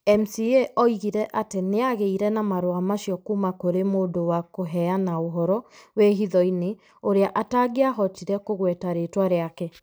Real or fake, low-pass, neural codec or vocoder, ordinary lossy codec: real; none; none; none